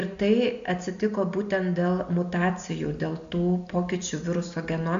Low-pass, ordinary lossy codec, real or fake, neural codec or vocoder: 7.2 kHz; AAC, 64 kbps; real; none